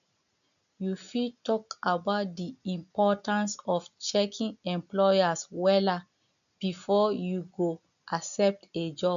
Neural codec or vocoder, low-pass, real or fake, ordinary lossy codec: none; 7.2 kHz; real; none